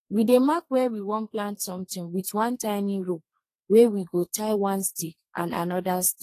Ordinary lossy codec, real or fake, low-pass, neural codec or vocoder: AAC, 48 kbps; fake; 14.4 kHz; codec, 32 kHz, 1.9 kbps, SNAC